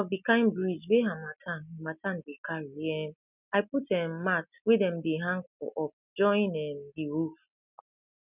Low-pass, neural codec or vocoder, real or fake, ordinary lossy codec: 3.6 kHz; none; real; none